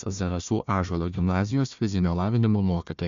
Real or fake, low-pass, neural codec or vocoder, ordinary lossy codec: fake; 7.2 kHz; codec, 16 kHz, 1 kbps, FunCodec, trained on Chinese and English, 50 frames a second; MP3, 64 kbps